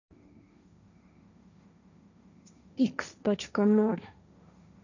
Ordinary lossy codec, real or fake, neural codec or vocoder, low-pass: none; fake; codec, 16 kHz, 1.1 kbps, Voila-Tokenizer; 7.2 kHz